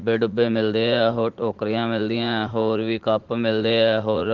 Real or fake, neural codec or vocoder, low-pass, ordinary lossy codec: fake; vocoder, 44.1 kHz, 80 mel bands, Vocos; 7.2 kHz; Opus, 16 kbps